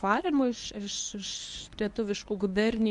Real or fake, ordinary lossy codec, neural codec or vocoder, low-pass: fake; AAC, 64 kbps; codec, 24 kHz, 0.9 kbps, WavTokenizer, medium speech release version 1; 10.8 kHz